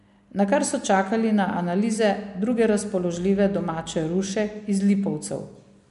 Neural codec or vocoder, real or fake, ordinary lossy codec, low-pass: none; real; MP3, 64 kbps; 10.8 kHz